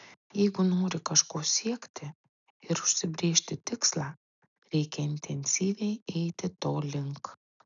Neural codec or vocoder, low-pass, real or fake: none; 7.2 kHz; real